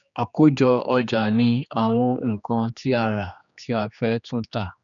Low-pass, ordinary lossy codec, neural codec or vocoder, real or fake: 7.2 kHz; none; codec, 16 kHz, 2 kbps, X-Codec, HuBERT features, trained on general audio; fake